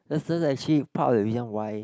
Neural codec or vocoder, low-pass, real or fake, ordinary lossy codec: none; none; real; none